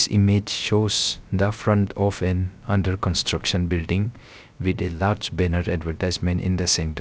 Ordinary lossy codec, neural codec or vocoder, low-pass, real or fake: none; codec, 16 kHz, 0.3 kbps, FocalCodec; none; fake